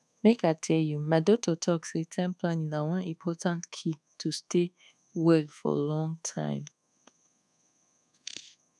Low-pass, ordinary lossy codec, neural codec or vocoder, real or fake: none; none; codec, 24 kHz, 1.2 kbps, DualCodec; fake